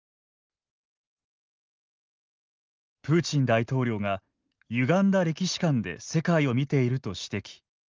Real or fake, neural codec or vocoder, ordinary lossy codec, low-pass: real; none; Opus, 32 kbps; 7.2 kHz